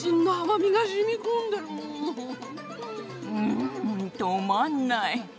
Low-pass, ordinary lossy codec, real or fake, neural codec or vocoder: none; none; real; none